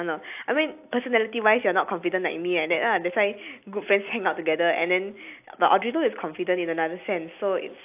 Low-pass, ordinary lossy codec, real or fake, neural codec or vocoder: 3.6 kHz; AAC, 32 kbps; real; none